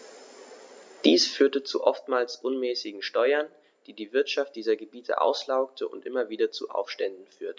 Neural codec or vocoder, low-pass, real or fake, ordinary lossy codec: none; none; real; none